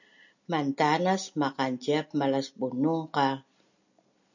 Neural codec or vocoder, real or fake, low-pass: none; real; 7.2 kHz